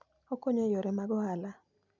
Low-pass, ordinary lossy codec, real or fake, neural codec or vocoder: 7.2 kHz; none; real; none